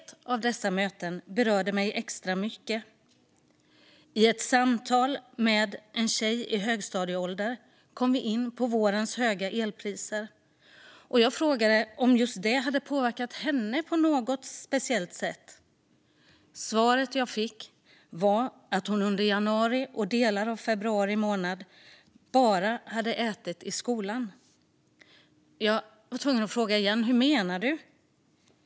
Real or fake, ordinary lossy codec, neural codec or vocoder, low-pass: real; none; none; none